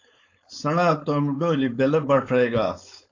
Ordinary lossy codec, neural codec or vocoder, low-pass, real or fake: AAC, 48 kbps; codec, 16 kHz, 4.8 kbps, FACodec; 7.2 kHz; fake